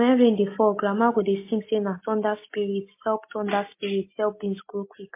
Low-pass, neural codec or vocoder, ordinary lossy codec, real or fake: 3.6 kHz; none; MP3, 24 kbps; real